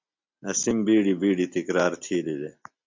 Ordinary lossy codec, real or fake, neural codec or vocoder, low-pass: MP3, 64 kbps; real; none; 7.2 kHz